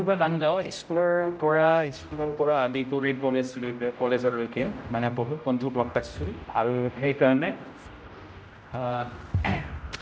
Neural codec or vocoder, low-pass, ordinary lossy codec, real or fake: codec, 16 kHz, 0.5 kbps, X-Codec, HuBERT features, trained on general audio; none; none; fake